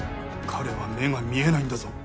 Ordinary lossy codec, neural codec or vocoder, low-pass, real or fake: none; none; none; real